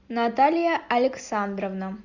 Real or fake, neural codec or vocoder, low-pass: real; none; 7.2 kHz